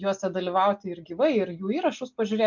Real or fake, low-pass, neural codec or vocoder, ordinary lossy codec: real; 7.2 kHz; none; MP3, 64 kbps